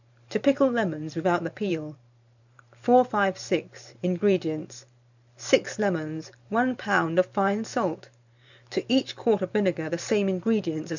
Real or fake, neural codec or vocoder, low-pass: fake; vocoder, 44.1 kHz, 128 mel bands every 512 samples, BigVGAN v2; 7.2 kHz